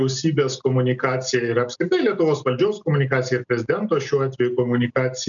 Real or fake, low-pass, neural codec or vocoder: real; 7.2 kHz; none